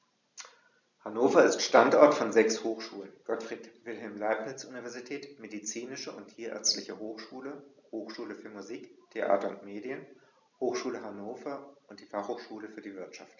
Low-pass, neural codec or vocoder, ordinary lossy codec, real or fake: 7.2 kHz; none; none; real